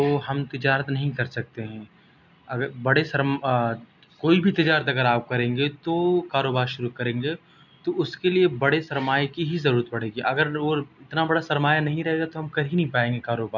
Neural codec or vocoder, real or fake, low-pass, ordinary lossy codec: none; real; 7.2 kHz; none